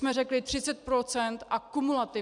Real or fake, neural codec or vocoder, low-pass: real; none; 10.8 kHz